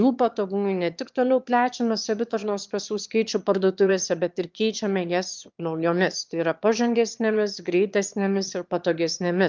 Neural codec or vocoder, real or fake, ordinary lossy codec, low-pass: autoencoder, 22.05 kHz, a latent of 192 numbers a frame, VITS, trained on one speaker; fake; Opus, 24 kbps; 7.2 kHz